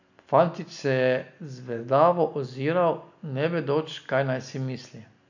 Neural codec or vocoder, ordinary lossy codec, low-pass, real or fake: none; none; 7.2 kHz; real